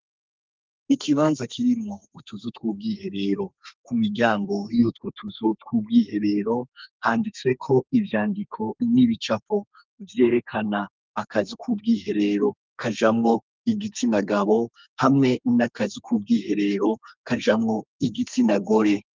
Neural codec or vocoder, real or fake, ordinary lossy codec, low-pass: codec, 32 kHz, 1.9 kbps, SNAC; fake; Opus, 24 kbps; 7.2 kHz